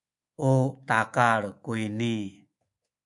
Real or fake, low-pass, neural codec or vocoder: fake; 10.8 kHz; codec, 24 kHz, 3.1 kbps, DualCodec